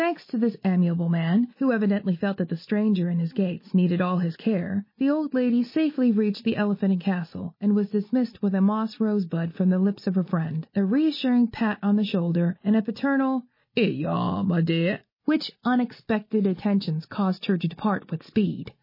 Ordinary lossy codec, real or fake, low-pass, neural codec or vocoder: MP3, 24 kbps; real; 5.4 kHz; none